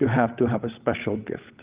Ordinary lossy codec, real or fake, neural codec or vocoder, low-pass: Opus, 32 kbps; fake; codec, 16 kHz, 16 kbps, FunCodec, trained on LibriTTS, 50 frames a second; 3.6 kHz